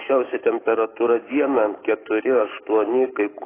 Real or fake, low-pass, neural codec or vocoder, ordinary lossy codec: fake; 3.6 kHz; codec, 44.1 kHz, 7.8 kbps, DAC; AAC, 16 kbps